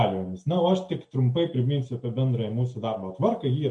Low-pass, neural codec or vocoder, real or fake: 10.8 kHz; none; real